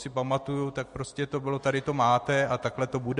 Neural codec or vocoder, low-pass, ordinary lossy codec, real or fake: none; 14.4 kHz; MP3, 48 kbps; real